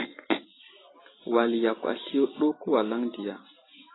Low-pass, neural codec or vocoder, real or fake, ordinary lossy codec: 7.2 kHz; none; real; AAC, 16 kbps